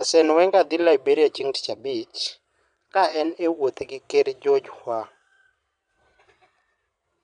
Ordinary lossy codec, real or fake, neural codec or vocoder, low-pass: none; fake; vocoder, 22.05 kHz, 80 mel bands, Vocos; 9.9 kHz